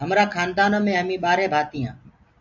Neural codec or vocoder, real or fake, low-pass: none; real; 7.2 kHz